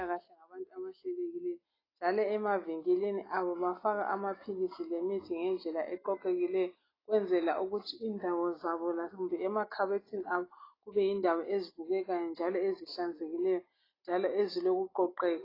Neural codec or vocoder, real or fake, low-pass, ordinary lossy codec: none; real; 5.4 kHz; AAC, 24 kbps